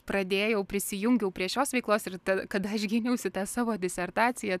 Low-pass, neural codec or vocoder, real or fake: 14.4 kHz; none; real